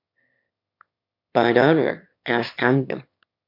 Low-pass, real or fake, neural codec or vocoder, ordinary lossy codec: 5.4 kHz; fake; autoencoder, 22.05 kHz, a latent of 192 numbers a frame, VITS, trained on one speaker; MP3, 48 kbps